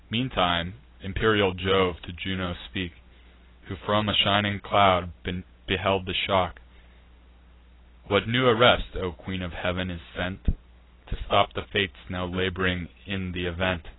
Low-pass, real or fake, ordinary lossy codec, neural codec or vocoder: 7.2 kHz; real; AAC, 16 kbps; none